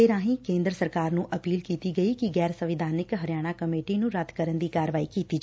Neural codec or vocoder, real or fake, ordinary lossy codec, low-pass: none; real; none; none